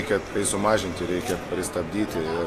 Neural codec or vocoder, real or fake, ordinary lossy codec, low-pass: none; real; AAC, 48 kbps; 14.4 kHz